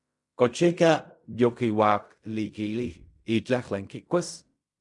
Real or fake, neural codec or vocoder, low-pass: fake; codec, 16 kHz in and 24 kHz out, 0.4 kbps, LongCat-Audio-Codec, fine tuned four codebook decoder; 10.8 kHz